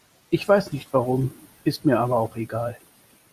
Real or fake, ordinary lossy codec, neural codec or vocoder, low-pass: real; Opus, 64 kbps; none; 14.4 kHz